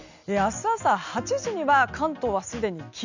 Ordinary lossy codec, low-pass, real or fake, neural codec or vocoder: none; 7.2 kHz; real; none